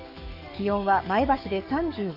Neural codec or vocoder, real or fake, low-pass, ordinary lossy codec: codec, 44.1 kHz, 7.8 kbps, DAC; fake; 5.4 kHz; none